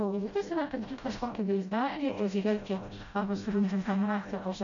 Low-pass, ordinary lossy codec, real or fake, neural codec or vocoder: 7.2 kHz; AAC, 64 kbps; fake; codec, 16 kHz, 0.5 kbps, FreqCodec, smaller model